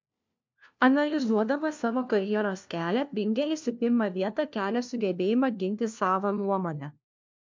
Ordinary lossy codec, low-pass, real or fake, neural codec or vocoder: MP3, 64 kbps; 7.2 kHz; fake; codec, 16 kHz, 1 kbps, FunCodec, trained on LibriTTS, 50 frames a second